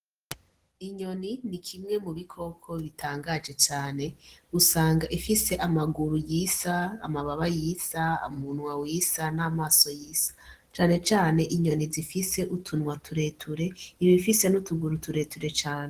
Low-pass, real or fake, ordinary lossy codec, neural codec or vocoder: 14.4 kHz; real; Opus, 16 kbps; none